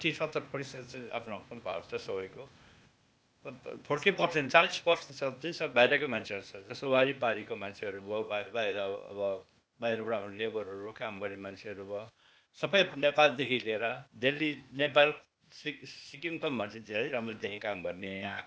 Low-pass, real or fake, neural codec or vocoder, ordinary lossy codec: none; fake; codec, 16 kHz, 0.8 kbps, ZipCodec; none